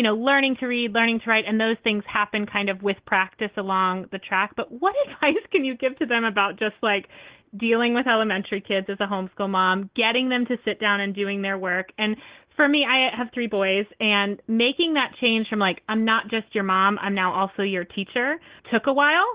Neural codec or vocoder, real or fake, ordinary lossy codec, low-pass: none; real; Opus, 16 kbps; 3.6 kHz